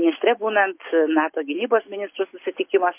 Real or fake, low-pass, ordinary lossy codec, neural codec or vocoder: real; 3.6 kHz; MP3, 24 kbps; none